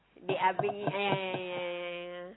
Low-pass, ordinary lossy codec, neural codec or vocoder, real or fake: 7.2 kHz; AAC, 16 kbps; none; real